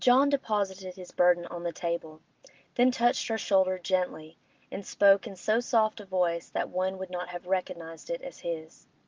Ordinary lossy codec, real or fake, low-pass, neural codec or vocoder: Opus, 32 kbps; real; 7.2 kHz; none